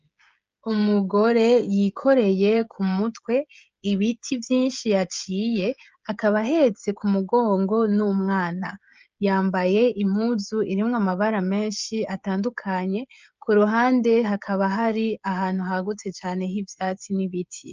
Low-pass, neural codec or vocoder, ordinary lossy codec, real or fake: 7.2 kHz; codec, 16 kHz, 16 kbps, FreqCodec, smaller model; Opus, 24 kbps; fake